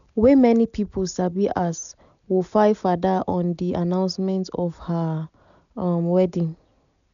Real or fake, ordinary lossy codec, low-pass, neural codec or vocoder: real; none; 7.2 kHz; none